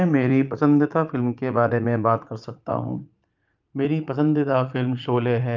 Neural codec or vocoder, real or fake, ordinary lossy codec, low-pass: vocoder, 44.1 kHz, 80 mel bands, Vocos; fake; Opus, 24 kbps; 7.2 kHz